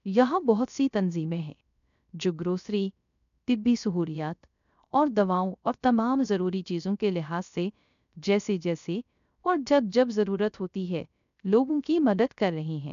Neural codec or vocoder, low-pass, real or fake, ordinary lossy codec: codec, 16 kHz, 0.3 kbps, FocalCodec; 7.2 kHz; fake; none